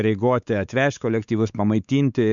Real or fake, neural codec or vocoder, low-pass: fake; codec, 16 kHz, 4 kbps, X-Codec, WavLM features, trained on Multilingual LibriSpeech; 7.2 kHz